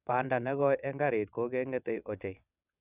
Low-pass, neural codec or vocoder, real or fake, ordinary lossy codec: 3.6 kHz; vocoder, 22.05 kHz, 80 mel bands, WaveNeXt; fake; none